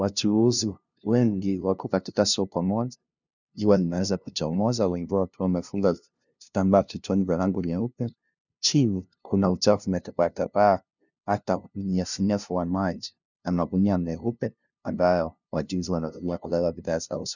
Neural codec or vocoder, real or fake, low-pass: codec, 16 kHz, 0.5 kbps, FunCodec, trained on LibriTTS, 25 frames a second; fake; 7.2 kHz